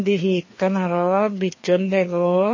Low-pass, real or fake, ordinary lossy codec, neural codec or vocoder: 7.2 kHz; fake; MP3, 32 kbps; codec, 16 kHz, 2 kbps, FreqCodec, larger model